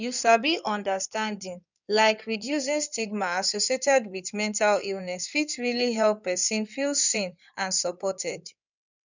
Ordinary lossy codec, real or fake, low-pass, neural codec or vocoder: none; fake; 7.2 kHz; codec, 16 kHz in and 24 kHz out, 2.2 kbps, FireRedTTS-2 codec